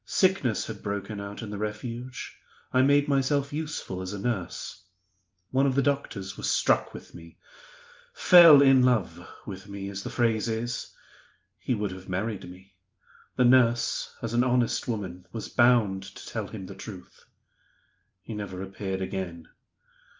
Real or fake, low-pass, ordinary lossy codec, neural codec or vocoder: real; 7.2 kHz; Opus, 24 kbps; none